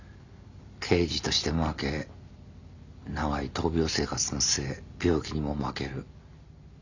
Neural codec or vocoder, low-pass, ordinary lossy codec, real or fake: none; 7.2 kHz; none; real